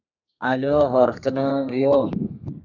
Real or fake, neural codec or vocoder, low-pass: fake; codec, 32 kHz, 1.9 kbps, SNAC; 7.2 kHz